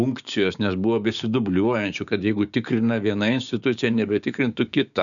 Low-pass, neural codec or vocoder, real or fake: 7.2 kHz; codec, 16 kHz, 6 kbps, DAC; fake